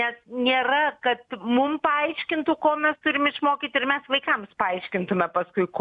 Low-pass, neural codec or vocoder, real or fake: 10.8 kHz; none; real